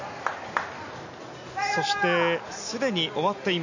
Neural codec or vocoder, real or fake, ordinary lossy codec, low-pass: none; real; none; 7.2 kHz